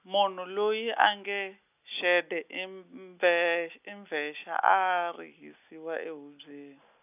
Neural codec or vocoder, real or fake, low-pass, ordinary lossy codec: none; real; 3.6 kHz; none